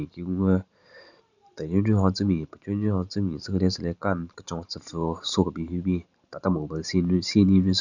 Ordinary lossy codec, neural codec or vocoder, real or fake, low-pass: none; vocoder, 44.1 kHz, 128 mel bands every 512 samples, BigVGAN v2; fake; 7.2 kHz